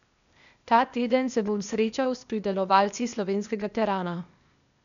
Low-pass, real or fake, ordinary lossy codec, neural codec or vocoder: 7.2 kHz; fake; none; codec, 16 kHz, 0.8 kbps, ZipCodec